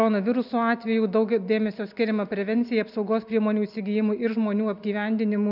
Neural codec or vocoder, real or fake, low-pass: none; real; 5.4 kHz